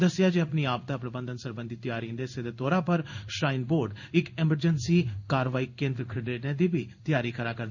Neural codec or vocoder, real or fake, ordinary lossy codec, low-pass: codec, 16 kHz in and 24 kHz out, 1 kbps, XY-Tokenizer; fake; none; 7.2 kHz